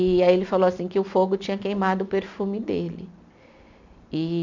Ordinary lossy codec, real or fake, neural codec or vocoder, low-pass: none; real; none; 7.2 kHz